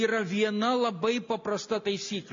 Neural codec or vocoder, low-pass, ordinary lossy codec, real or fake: none; 7.2 kHz; MP3, 48 kbps; real